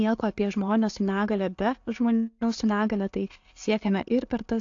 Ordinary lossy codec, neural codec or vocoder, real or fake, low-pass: AAC, 48 kbps; none; real; 7.2 kHz